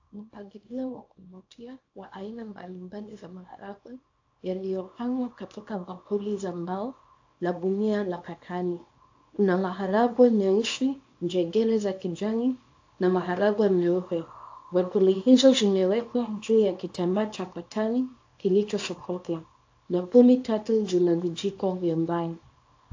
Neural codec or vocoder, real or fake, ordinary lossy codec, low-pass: codec, 24 kHz, 0.9 kbps, WavTokenizer, small release; fake; AAC, 48 kbps; 7.2 kHz